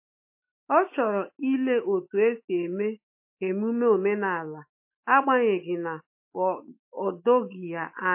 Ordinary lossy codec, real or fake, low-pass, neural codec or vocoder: MP3, 24 kbps; fake; 3.6 kHz; autoencoder, 48 kHz, 128 numbers a frame, DAC-VAE, trained on Japanese speech